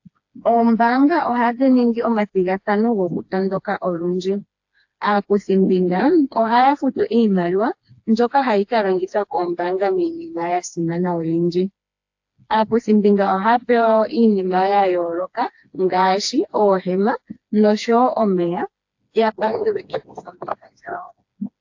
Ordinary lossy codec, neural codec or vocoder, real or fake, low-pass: AAC, 48 kbps; codec, 16 kHz, 2 kbps, FreqCodec, smaller model; fake; 7.2 kHz